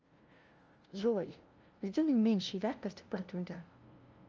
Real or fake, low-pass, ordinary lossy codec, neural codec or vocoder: fake; 7.2 kHz; Opus, 24 kbps; codec, 16 kHz, 0.5 kbps, FunCodec, trained on LibriTTS, 25 frames a second